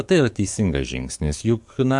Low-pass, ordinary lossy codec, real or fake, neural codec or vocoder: 10.8 kHz; MP3, 64 kbps; fake; codec, 44.1 kHz, 7.8 kbps, DAC